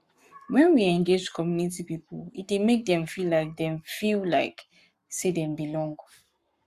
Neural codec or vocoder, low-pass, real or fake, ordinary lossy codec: codec, 44.1 kHz, 7.8 kbps, Pupu-Codec; 14.4 kHz; fake; Opus, 64 kbps